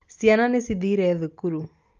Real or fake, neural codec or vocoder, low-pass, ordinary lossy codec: real; none; 7.2 kHz; Opus, 32 kbps